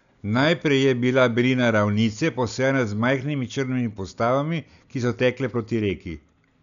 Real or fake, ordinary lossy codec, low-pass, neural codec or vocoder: real; none; 7.2 kHz; none